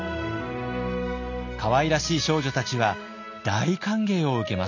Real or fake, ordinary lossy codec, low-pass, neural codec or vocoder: real; none; 7.2 kHz; none